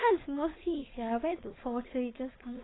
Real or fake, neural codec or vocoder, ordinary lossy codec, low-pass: fake; codec, 16 kHz, 4.8 kbps, FACodec; AAC, 16 kbps; 7.2 kHz